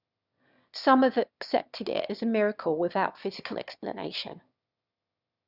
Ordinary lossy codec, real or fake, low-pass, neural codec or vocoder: Opus, 64 kbps; fake; 5.4 kHz; autoencoder, 22.05 kHz, a latent of 192 numbers a frame, VITS, trained on one speaker